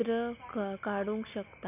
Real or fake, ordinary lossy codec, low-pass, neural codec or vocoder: real; none; 3.6 kHz; none